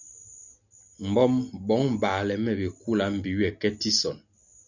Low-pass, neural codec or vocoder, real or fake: 7.2 kHz; none; real